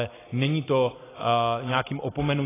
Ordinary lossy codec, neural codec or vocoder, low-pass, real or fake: AAC, 16 kbps; none; 3.6 kHz; real